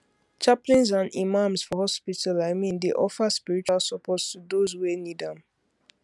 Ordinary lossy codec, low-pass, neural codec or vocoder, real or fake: none; none; none; real